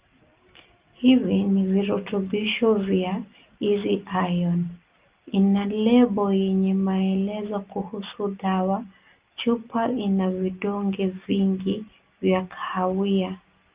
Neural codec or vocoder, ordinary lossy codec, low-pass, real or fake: none; Opus, 32 kbps; 3.6 kHz; real